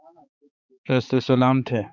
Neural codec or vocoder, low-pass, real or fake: codec, 16 kHz, 4 kbps, X-Codec, HuBERT features, trained on balanced general audio; 7.2 kHz; fake